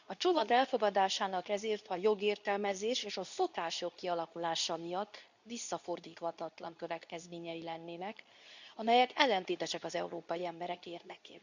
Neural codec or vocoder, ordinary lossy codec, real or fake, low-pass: codec, 24 kHz, 0.9 kbps, WavTokenizer, medium speech release version 2; none; fake; 7.2 kHz